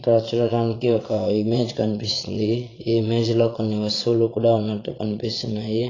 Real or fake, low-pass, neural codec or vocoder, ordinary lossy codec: fake; 7.2 kHz; vocoder, 22.05 kHz, 80 mel bands, Vocos; AAC, 32 kbps